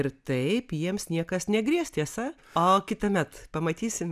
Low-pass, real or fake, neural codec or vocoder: 14.4 kHz; real; none